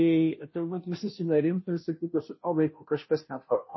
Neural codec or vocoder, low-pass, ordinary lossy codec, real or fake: codec, 16 kHz, 0.5 kbps, FunCodec, trained on Chinese and English, 25 frames a second; 7.2 kHz; MP3, 24 kbps; fake